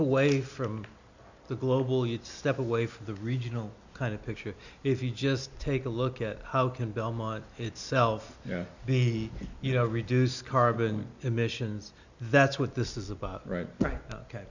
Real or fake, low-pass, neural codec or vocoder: real; 7.2 kHz; none